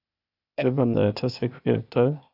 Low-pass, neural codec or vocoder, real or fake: 5.4 kHz; codec, 16 kHz, 0.8 kbps, ZipCodec; fake